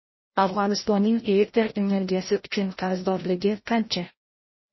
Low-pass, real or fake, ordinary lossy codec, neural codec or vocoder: 7.2 kHz; fake; MP3, 24 kbps; codec, 16 kHz, 0.5 kbps, FreqCodec, larger model